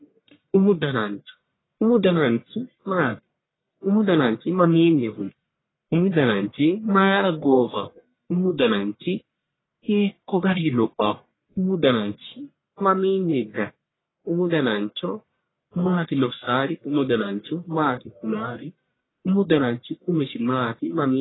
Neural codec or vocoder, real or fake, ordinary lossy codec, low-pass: codec, 44.1 kHz, 1.7 kbps, Pupu-Codec; fake; AAC, 16 kbps; 7.2 kHz